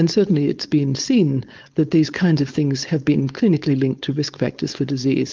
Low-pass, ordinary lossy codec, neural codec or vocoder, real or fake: 7.2 kHz; Opus, 24 kbps; codec, 16 kHz, 4.8 kbps, FACodec; fake